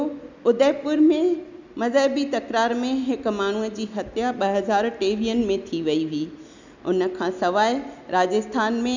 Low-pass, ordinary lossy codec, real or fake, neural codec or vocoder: 7.2 kHz; none; real; none